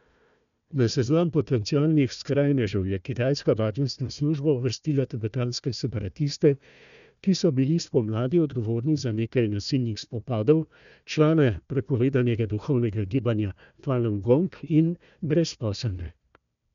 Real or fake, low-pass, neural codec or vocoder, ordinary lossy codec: fake; 7.2 kHz; codec, 16 kHz, 1 kbps, FunCodec, trained on Chinese and English, 50 frames a second; none